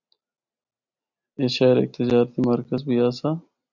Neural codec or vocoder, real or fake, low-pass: none; real; 7.2 kHz